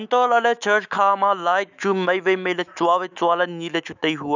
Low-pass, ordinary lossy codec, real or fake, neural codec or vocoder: 7.2 kHz; none; fake; autoencoder, 48 kHz, 128 numbers a frame, DAC-VAE, trained on Japanese speech